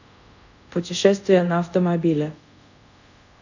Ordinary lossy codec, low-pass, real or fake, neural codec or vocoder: none; 7.2 kHz; fake; codec, 24 kHz, 0.5 kbps, DualCodec